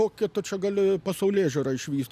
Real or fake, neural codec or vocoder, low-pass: real; none; 14.4 kHz